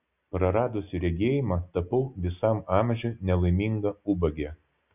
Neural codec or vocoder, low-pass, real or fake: none; 3.6 kHz; real